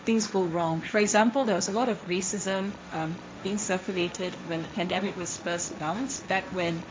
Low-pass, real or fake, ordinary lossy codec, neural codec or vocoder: none; fake; none; codec, 16 kHz, 1.1 kbps, Voila-Tokenizer